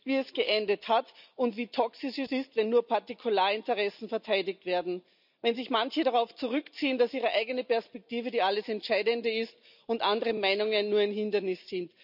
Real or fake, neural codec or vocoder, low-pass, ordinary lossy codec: real; none; 5.4 kHz; none